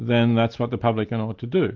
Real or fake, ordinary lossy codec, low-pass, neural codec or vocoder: real; Opus, 32 kbps; 7.2 kHz; none